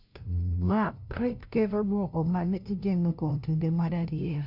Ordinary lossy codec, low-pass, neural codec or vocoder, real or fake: none; 5.4 kHz; codec, 16 kHz, 0.5 kbps, FunCodec, trained on LibriTTS, 25 frames a second; fake